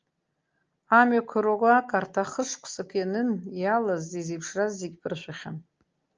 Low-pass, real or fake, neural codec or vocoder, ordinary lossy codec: 7.2 kHz; real; none; Opus, 24 kbps